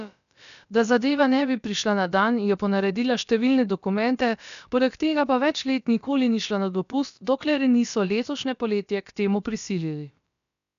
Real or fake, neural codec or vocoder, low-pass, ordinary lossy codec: fake; codec, 16 kHz, about 1 kbps, DyCAST, with the encoder's durations; 7.2 kHz; none